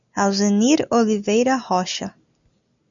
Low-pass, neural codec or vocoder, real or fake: 7.2 kHz; none; real